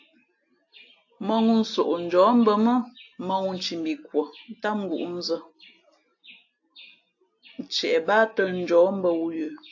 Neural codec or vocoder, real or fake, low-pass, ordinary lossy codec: none; real; 7.2 kHz; AAC, 48 kbps